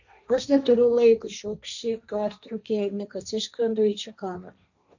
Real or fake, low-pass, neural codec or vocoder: fake; 7.2 kHz; codec, 16 kHz, 1.1 kbps, Voila-Tokenizer